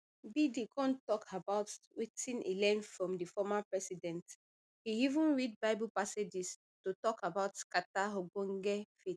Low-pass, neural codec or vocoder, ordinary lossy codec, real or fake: none; none; none; real